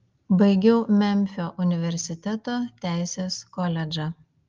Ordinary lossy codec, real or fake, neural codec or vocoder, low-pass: Opus, 32 kbps; real; none; 7.2 kHz